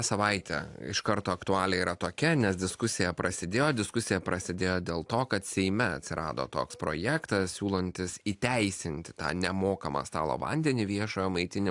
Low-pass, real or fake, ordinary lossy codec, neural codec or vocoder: 10.8 kHz; real; AAC, 64 kbps; none